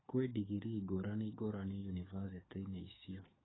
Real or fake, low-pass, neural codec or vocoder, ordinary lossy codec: fake; 7.2 kHz; codec, 44.1 kHz, 7.8 kbps, DAC; AAC, 16 kbps